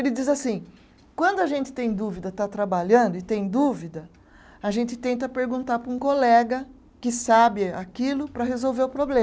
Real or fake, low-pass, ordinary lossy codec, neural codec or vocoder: real; none; none; none